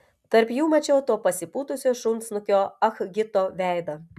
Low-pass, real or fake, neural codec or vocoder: 14.4 kHz; real; none